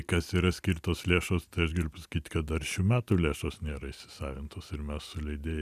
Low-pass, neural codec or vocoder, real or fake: 14.4 kHz; none; real